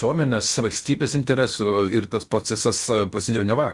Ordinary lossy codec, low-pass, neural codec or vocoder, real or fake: Opus, 64 kbps; 10.8 kHz; codec, 16 kHz in and 24 kHz out, 0.8 kbps, FocalCodec, streaming, 65536 codes; fake